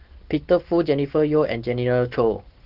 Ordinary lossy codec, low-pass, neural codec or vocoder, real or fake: Opus, 16 kbps; 5.4 kHz; none; real